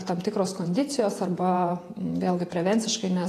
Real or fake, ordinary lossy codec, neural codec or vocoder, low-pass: fake; AAC, 48 kbps; vocoder, 48 kHz, 128 mel bands, Vocos; 14.4 kHz